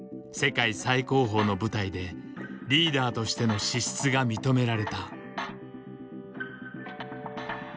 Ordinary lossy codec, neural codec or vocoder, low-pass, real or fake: none; none; none; real